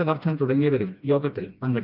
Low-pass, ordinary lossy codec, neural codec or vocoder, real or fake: 5.4 kHz; none; codec, 16 kHz, 1 kbps, FreqCodec, smaller model; fake